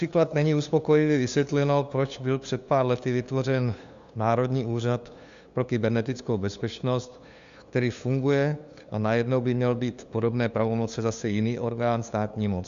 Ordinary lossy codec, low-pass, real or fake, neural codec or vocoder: Opus, 64 kbps; 7.2 kHz; fake; codec, 16 kHz, 2 kbps, FunCodec, trained on LibriTTS, 25 frames a second